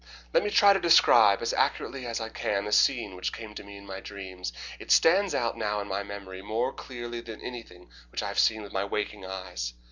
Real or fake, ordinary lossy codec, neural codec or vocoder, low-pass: real; Opus, 64 kbps; none; 7.2 kHz